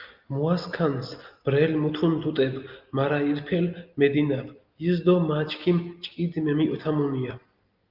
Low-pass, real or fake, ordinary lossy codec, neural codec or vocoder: 5.4 kHz; real; Opus, 32 kbps; none